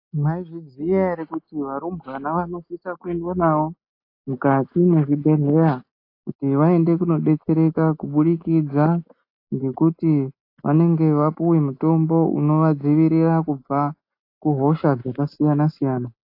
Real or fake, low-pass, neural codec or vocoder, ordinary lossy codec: real; 5.4 kHz; none; AAC, 32 kbps